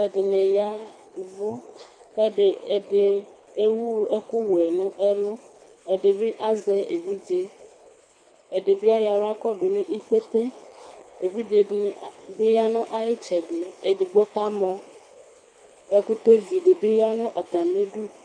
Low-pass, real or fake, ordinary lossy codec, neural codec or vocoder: 9.9 kHz; fake; AAC, 64 kbps; codec, 24 kHz, 3 kbps, HILCodec